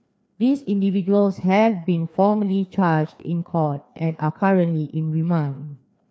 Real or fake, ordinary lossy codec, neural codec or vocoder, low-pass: fake; none; codec, 16 kHz, 2 kbps, FreqCodec, larger model; none